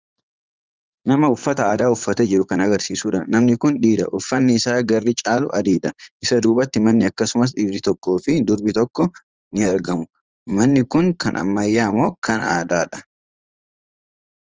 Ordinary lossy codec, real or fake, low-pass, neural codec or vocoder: Opus, 24 kbps; fake; 7.2 kHz; vocoder, 44.1 kHz, 80 mel bands, Vocos